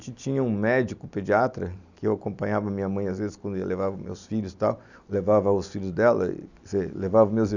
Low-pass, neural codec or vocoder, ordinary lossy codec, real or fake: 7.2 kHz; none; none; real